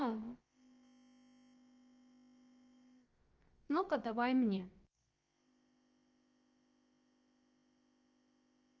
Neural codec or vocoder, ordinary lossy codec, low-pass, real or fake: codec, 16 kHz, about 1 kbps, DyCAST, with the encoder's durations; Opus, 24 kbps; 7.2 kHz; fake